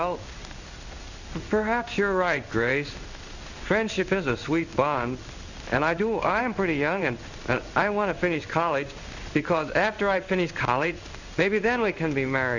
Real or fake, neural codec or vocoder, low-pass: fake; codec, 16 kHz in and 24 kHz out, 1 kbps, XY-Tokenizer; 7.2 kHz